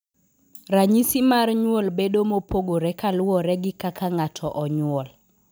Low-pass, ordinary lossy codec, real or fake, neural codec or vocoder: none; none; real; none